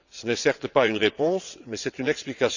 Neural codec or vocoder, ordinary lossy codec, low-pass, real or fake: vocoder, 22.05 kHz, 80 mel bands, WaveNeXt; none; 7.2 kHz; fake